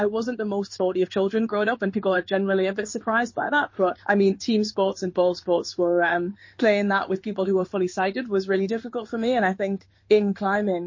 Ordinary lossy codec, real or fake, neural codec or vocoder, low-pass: MP3, 32 kbps; fake; codec, 16 kHz in and 24 kHz out, 1 kbps, XY-Tokenizer; 7.2 kHz